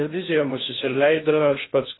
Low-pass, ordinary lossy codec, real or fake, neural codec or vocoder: 7.2 kHz; AAC, 16 kbps; fake; codec, 16 kHz in and 24 kHz out, 0.6 kbps, FocalCodec, streaming, 2048 codes